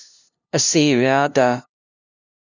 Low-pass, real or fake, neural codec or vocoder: 7.2 kHz; fake; codec, 16 kHz, 0.5 kbps, FunCodec, trained on LibriTTS, 25 frames a second